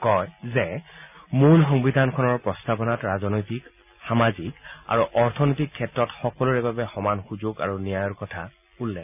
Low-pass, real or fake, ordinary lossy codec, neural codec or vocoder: 3.6 kHz; real; none; none